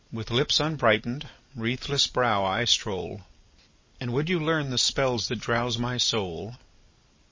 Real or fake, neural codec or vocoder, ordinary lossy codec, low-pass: real; none; MP3, 32 kbps; 7.2 kHz